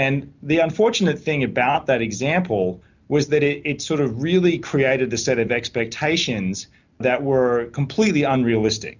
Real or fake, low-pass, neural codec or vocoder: real; 7.2 kHz; none